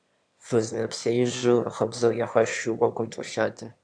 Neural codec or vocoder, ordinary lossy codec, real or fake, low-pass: autoencoder, 22.05 kHz, a latent of 192 numbers a frame, VITS, trained on one speaker; AAC, 64 kbps; fake; 9.9 kHz